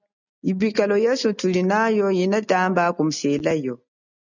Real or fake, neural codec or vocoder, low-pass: real; none; 7.2 kHz